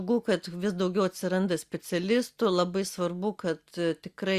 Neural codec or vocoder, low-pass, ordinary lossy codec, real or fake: none; 14.4 kHz; Opus, 64 kbps; real